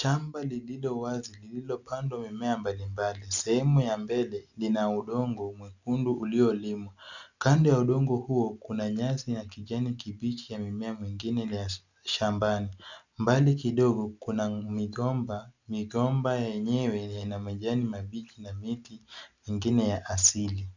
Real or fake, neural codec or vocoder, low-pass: real; none; 7.2 kHz